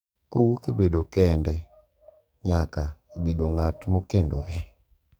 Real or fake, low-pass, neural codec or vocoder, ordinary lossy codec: fake; none; codec, 44.1 kHz, 2.6 kbps, SNAC; none